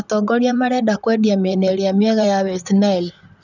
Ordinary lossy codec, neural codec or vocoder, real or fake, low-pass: none; vocoder, 44.1 kHz, 128 mel bands, Pupu-Vocoder; fake; 7.2 kHz